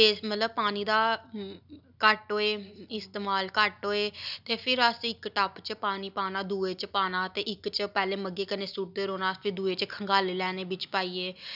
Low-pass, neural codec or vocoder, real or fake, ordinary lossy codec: 5.4 kHz; none; real; none